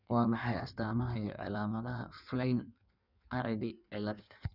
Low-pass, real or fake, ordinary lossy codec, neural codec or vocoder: 5.4 kHz; fake; none; codec, 16 kHz in and 24 kHz out, 1.1 kbps, FireRedTTS-2 codec